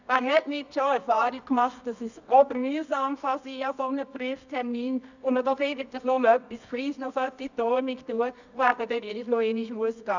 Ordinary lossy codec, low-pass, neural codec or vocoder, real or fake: none; 7.2 kHz; codec, 24 kHz, 0.9 kbps, WavTokenizer, medium music audio release; fake